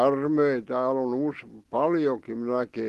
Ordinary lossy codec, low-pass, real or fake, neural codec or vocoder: Opus, 16 kbps; 14.4 kHz; real; none